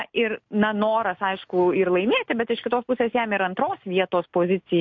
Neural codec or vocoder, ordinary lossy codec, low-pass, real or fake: none; MP3, 48 kbps; 7.2 kHz; real